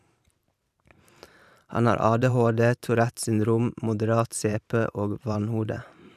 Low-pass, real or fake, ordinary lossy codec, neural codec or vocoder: 14.4 kHz; fake; none; vocoder, 44.1 kHz, 128 mel bands every 512 samples, BigVGAN v2